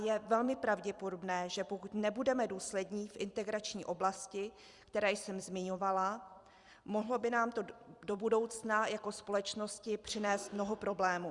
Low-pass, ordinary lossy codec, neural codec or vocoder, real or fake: 10.8 kHz; Opus, 64 kbps; vocoder, 44.1 kHz, 128 mel bands every 256 samples, BigVGAN v2; fake